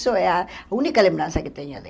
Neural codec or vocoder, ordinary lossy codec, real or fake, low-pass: none; none; real; none